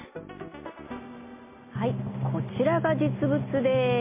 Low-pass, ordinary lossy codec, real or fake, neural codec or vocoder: 3.6 kHz; MP3, 24 kbps; real; none